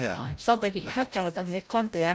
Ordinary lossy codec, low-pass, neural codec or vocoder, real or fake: none; none; codec, 16 kHz, 0.5 kbps, FreqCodec, larger model; fake